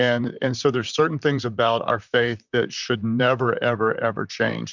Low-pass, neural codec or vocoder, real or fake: 7.2 kHz; vocoder, 44.1 kHz, 128 mel bands, Pupu-Vocoder; fake